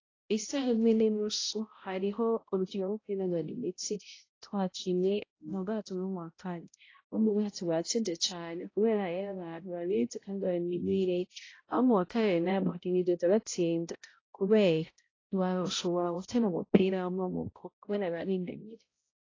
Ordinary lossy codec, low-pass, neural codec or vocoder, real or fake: AAC, 32 kbps; 7.2 kHz; codec, 16 kHz, 0.5 kbps, X-Codec, HuBERT features, trained on balanced general audio; fake